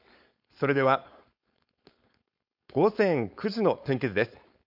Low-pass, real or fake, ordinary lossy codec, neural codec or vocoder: 5.4 kHz; fake; AAC, 48 kbps; codec, 16 kHz, 4.8 kbps, FACodec